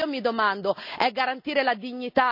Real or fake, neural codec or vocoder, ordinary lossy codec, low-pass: real; none; none; 5.4 kHz